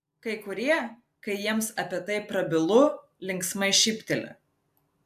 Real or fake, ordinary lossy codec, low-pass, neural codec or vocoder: real; AAC, 96 kbps; 14.4 kHz; none